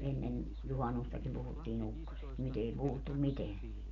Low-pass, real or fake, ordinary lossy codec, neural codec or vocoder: 7.2 kHz; real; none; none